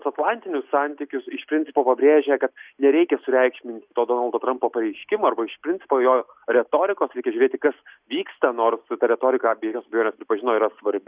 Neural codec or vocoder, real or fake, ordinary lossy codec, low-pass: none; real; Opus, 64 kbps; 3.6 kHz